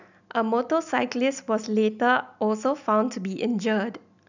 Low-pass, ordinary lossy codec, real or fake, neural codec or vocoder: 7.2 kHz; none; real; none